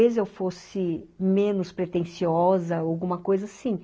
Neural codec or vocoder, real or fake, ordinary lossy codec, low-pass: none; real; none; none